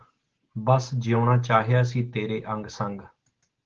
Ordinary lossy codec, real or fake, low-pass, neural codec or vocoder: Opus, 16 kbps; real; 7.2 kHz; none